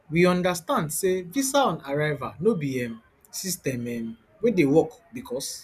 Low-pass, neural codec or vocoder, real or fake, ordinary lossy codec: 14.4 kHz; none; real; none